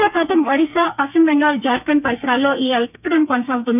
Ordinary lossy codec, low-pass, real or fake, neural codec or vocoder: none; 3.6 kHz; fake; codec, 32 kHz, 1.9 kbps, SNAC